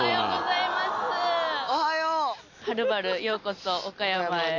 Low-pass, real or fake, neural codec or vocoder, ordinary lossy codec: 7.2 kHz; real; none; none